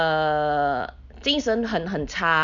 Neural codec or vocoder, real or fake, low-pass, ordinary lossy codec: none; real; 7.2 kHz; none